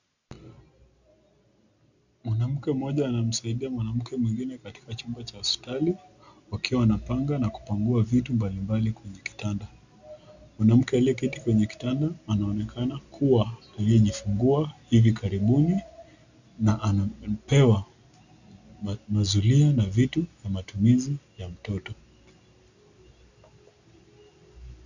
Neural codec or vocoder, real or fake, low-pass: none; real; 7.2 kHz